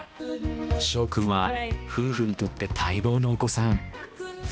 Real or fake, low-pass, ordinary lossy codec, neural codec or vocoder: fake; none; none; codec, 16 kHz, 1 kbps, X-Codec, HuBERT features, trained on balanced general audio